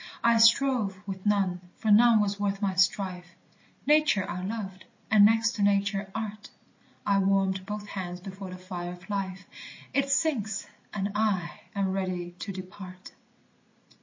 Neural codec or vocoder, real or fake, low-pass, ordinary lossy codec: none; real; 7.2 kHz; MP3, 32 kbps